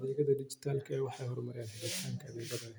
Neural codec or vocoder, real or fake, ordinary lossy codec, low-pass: none; real; none; none